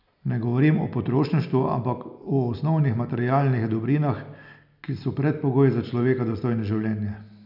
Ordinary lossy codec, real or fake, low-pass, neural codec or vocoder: none; real; 5.4 kHz; none